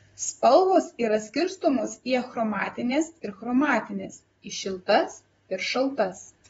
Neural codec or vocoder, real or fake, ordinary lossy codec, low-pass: vocoder, 44.1 kHz, 128 mel bands, Pupu-Vocoder; fake; AAC, 24 kbps; 19.8 kHz